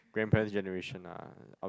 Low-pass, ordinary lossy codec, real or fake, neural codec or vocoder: none; none; real; none